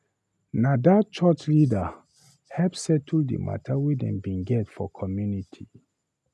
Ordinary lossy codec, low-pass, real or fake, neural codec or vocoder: none; none; real; none